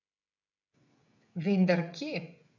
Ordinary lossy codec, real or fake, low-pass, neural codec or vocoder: none; fake; 7.2 kHz; codec, 16 kHz, 16 kbps, FreqCodec, smaller model